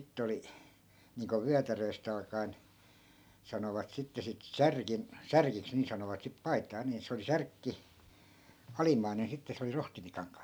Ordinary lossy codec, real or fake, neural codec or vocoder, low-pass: none; real; none; none